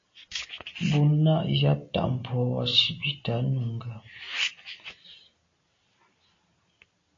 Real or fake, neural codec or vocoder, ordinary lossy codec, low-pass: real; none; AAC, 32 kbps; 7.2 kHz